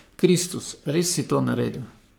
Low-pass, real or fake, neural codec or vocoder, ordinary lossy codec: none; fake; codec, 44.1 kHz, 3.4 kbps, Pupu-Codec; none